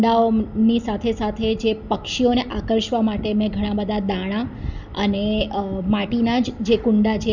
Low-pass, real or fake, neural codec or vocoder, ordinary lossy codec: 7.2 kHz; real; none; none